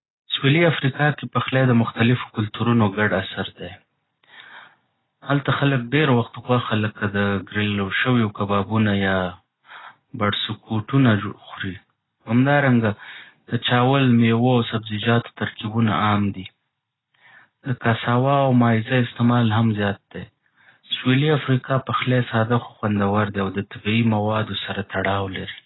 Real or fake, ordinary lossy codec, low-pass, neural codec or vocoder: real; AAC, 16 kbps; 7.2 kHz; none